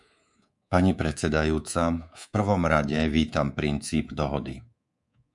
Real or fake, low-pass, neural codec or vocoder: fake; 10.8 kHz; codec, 24 kHz, 3.1 kbps, DualCodec